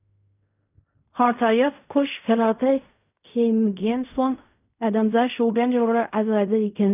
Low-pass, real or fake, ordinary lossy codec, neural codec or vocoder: 3.6 kHz; fake; none; codec, 16 kHz in and 24 kHz out, 0.4 kbps, LongCat-Audio-Codec, fine tuned four codebook decoder